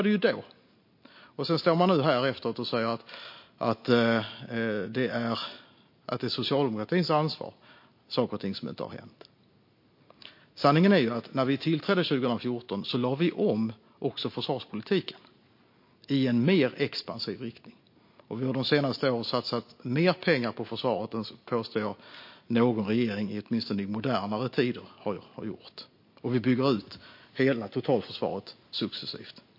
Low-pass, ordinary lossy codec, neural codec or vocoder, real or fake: 5.4 kHz; MP3, 32 kbps; none; real